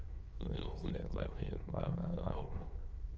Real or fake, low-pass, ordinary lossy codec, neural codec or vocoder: fake; 7.2 kHz; Opus, 24 kbps; autoencoder, 22.05 kHz, a latent of 192 numbers a frame, VITS, trained on many speakers